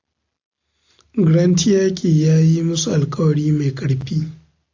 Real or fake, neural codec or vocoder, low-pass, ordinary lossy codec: real; none; 7.2 kHz; AAC, 48 kbps